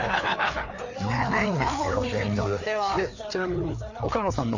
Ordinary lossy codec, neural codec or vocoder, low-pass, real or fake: none; codec, 16 kHz, 4 kbps, FreqCodec, larger model; 7.2 kHz; fake